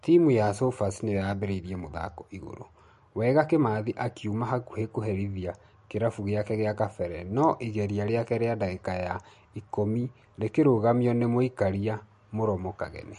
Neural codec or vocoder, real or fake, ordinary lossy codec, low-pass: none; real; MP3, 48 kbps; 14.4 kHz